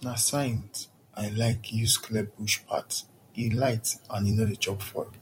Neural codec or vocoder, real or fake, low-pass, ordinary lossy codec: vocoder, 44.1 kHz, 128 mel bands every 256 samples, BigVGAN v2; fake; 19.8 kHz; MP3, 64 kbps